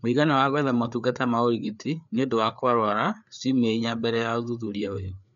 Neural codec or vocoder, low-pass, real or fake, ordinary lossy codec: codec, 16 kHz, 4 kbps, FreqCodec, larger model; 7.2 kHz; fake; none